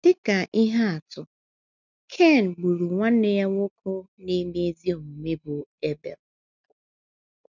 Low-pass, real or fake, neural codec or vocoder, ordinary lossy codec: 7.2 kHz; real; none; none